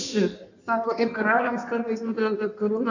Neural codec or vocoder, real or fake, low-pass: codec, 16 kHz in and 24 kHz out, 1.1 kbps, FireRedTTS-2 codec; fake; 7.2 kHz